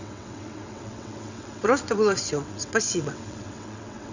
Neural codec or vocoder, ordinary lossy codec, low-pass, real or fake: vocoder, 22.05 kHz, 80 mel bands, WaveNeXt; none; 7.2 kHz; fake